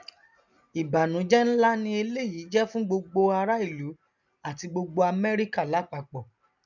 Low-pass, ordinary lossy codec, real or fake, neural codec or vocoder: 7.2 kHz; none; real; none